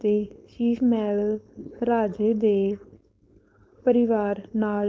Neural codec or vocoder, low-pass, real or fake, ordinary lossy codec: codec, 16 kHz, 4.8 kbps, FACodec; none; fake; none